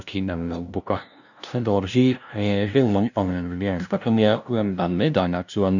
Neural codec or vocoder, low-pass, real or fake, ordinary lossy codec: codec, 16 kHz, 0.5 kbps, FunCodec, trained on LibriTTS, 25 frames a second; 7.2 kHz; fake; none